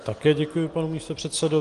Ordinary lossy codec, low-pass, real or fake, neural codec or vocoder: Opus, 24 kbps; 10.8 kHz; real; none